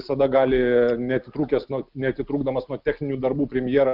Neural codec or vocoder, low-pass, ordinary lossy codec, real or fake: none; 5.4 kHz; Opus, 24 kbps; real